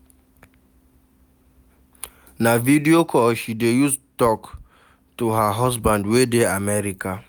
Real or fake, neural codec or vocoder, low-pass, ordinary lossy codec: real; none; none; none